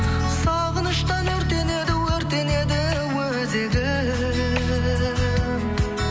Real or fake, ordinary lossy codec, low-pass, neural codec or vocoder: real; none; none; none